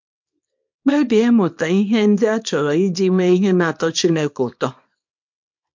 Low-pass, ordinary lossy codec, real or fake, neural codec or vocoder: 7.2 kHz; MP3, 48 kbps; fake; codec, 24 kHz, 0.9 kbps, WavTokenizer, small release